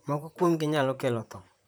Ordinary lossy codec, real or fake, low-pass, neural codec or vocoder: none; fake; none; vocoder, 44.1 kHz, 128 mel bands, Pupu-Vocoder